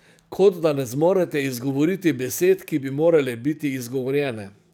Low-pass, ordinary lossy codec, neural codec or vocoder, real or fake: 19.8 kHz; none; codec, 44.1 kHz, 7.8 kbps, DAC; fake